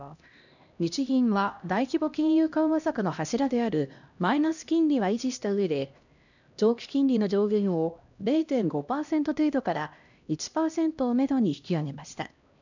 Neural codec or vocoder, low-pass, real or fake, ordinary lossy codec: codec, 16 kHz, 1 kbps, X-Codec, HuBERT features, trained on LibriSpeech; 7.2 kHz; fake; none